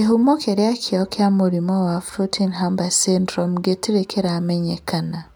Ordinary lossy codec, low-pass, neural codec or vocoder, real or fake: none; none; none; real